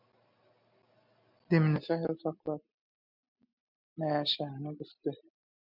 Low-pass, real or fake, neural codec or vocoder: 5.4 kHz; real; none